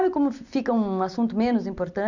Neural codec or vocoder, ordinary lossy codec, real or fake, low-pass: none; none; real; 7.2 kHz